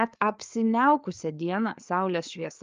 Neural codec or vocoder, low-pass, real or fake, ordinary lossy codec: codec, 16 kHz, 4 kbps, FreqCodec, larger model; 7.2 kHz; fake; Opus, 24 kbps